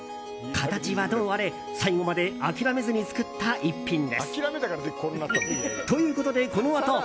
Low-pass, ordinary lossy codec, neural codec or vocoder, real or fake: none; none; none; real